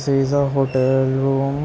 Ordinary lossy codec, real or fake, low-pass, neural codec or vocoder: none; real; none; none